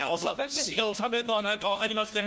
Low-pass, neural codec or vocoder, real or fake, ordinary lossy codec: none; codec, 16 kHz, 1 kbps, FunCodec, trained on LibriTTS, 50 frames a second; fake; none